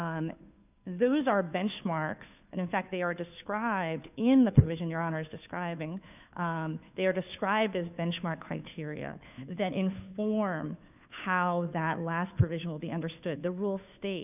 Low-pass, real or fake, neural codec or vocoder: 3.6 kHz; fake; codec, 16 kHz, 2 kbps, FunCodec, trained on Chinese and English, 25 frames a second